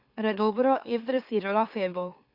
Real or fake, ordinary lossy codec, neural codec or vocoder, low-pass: fake; Opus, 64 kbps; autoencoder, 44.1 kHz, a latent of 192 numbers a frame, MeloTTS; 5.4 kHz